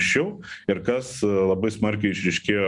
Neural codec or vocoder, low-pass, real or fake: none; 10.8 kHz; real